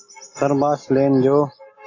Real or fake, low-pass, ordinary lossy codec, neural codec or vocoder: real; 7.2 kHz; AAC, 32 kbps; none